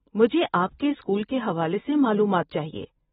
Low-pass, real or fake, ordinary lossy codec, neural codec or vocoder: 14.4 kHz; real; AAC, 16 kbps; none